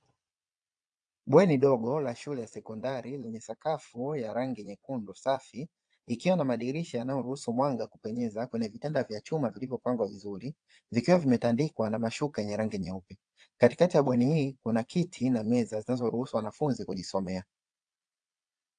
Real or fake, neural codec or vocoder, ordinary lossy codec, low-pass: fake; vocoder, 22.05 kHz, 80 mel bands, Vocos; AAC, 64 kbps; 9.9 kHz